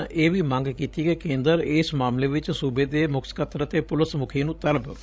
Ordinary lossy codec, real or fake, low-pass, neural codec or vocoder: none; fake; none; codec, 16 kHz, 16 kbps, FreqCodec, larger model